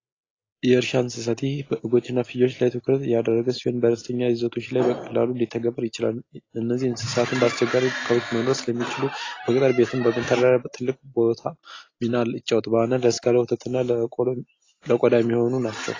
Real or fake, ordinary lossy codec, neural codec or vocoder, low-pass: real; AAC, 32 kbps; none; 7.2 kHz